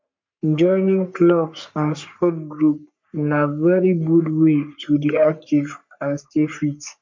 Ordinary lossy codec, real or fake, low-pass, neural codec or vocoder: MP3, 64 kbps; fake; 7.2 kHz; codec, 44.1 kHz, 3.4 kbps, Pupu-Codec